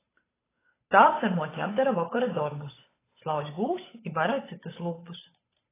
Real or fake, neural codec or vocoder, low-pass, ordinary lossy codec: fake; codec, 16 kHz, 8 kbps, FunCodec, trained on Chinese and English, 25 frames a second; 3.6 kHz; AAC, 16 kbps